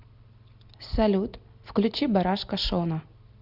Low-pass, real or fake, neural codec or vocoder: 5.4 kHz; real; none